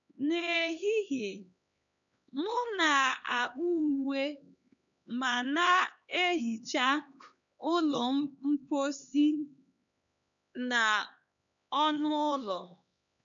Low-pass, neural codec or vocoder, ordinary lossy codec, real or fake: 7.2 kHz; codec, 16 kHz, 2 kbps, X-Codec, HuBERT features, trained on LibriSpeech; none; fake